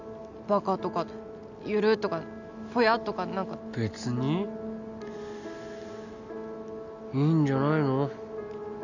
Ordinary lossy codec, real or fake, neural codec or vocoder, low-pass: none; real; none; 7.2 kHz